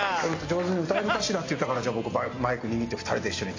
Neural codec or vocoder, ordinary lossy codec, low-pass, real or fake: none; AAC, 32 kbps; 7.2 kHz; real